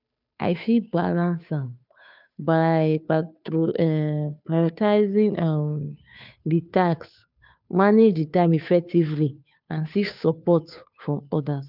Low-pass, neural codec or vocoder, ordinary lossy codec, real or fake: 5.4 kHz; codec, 16 kHz, 2 kbps, FunCodec, trained on Chinese and English, 25 frames a second; none; fake